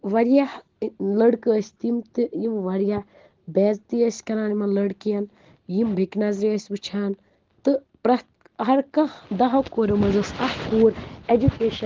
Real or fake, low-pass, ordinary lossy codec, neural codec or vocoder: real; 7.2 kHz; Opus, 16 kbps; none